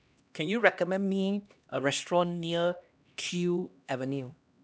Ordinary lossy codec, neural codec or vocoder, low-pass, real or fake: none; codec, 16 kHz, 1 kbps, X-Codec, HuBERT features, trained on LibriSpeech; none; fake